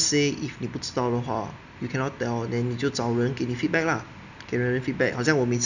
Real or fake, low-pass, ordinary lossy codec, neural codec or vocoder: real; 7.2 kHz; none; none